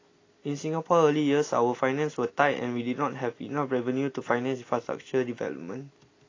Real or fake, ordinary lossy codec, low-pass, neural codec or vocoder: real; AAC, 32 kbps; 7.2 kHz; none